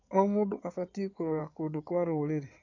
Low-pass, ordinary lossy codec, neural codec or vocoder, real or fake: 7.2 kHz; AAC, 48 kbps; codec, 16 kHz in and 24 kHz out, 2.2 kbps, FireRedTTS-2 codec; fake